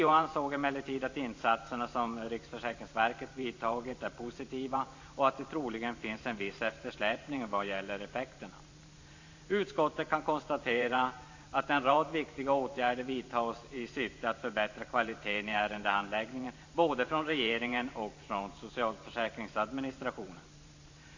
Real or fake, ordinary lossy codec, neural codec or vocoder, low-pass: fake; AAC, 48 kbps; vocoder, 44.1 kHz, 128 mel bands every 512 samples, BigVGAN v2; 7.2 kHz